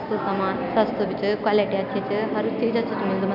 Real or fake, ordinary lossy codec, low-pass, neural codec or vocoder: real; none; 5.4 kHz; none